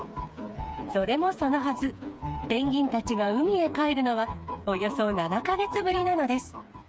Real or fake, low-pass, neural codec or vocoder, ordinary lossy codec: fake; none; codec, 16 kHz, 4 kbps, FreqCodec, smaller model; none